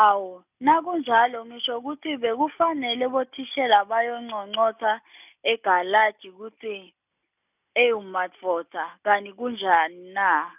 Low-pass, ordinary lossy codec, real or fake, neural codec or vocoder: 3.6 kHz; none; real; none